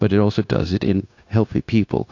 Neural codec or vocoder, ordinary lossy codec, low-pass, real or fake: codec, 16 kHz, 0.9 kbps, LongCat-Audio-Codec; AAC, 48 kbps; 7.2 kHz; fake